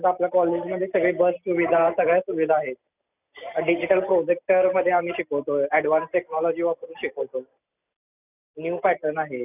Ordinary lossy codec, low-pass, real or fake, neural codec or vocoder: none; 3.6 kHz; real; none